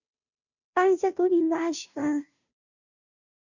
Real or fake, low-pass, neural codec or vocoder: fake; 7.2 kHz; codec, 16 kHz, 0.5 kbps, FunCodec, trained on Chinese and English, 25 frames a second